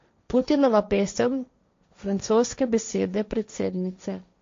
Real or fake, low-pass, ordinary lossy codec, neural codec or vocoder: fake; 7.2 kHz; MP3, 48 kbps; codec, 16 kHz, 1.1 kbps, Voila-Tokenizer